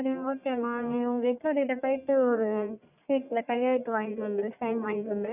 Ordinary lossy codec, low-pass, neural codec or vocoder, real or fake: none; 3.6 kHz; codec, 44.1 kHz, 1.7 kbps, Pupu-Codec; fake